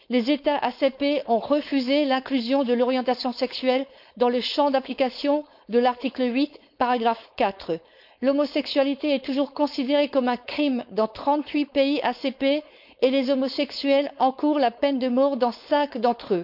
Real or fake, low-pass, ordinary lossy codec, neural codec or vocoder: fake; 5.4 kHz; none; codec, 16 kHz, 4.8 kbps, FACodec